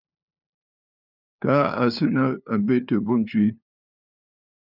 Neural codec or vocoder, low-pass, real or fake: codec, 16 kHz, 2 kbps, FunCodec, trained on LibriTTS, 25 frames a second; 5.4 kHz; fake